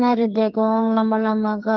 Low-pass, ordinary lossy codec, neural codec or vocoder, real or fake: 7.2 kHz; Opus, 24 kbps; codec, 44.1 kHz, 2.6 kbps, SNAC; fake